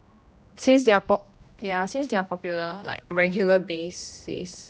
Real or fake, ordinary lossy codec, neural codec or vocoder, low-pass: fake; none; codec, 16 kHz, 1 kbps, X-Codec, HuBERT features, trained on general audio; none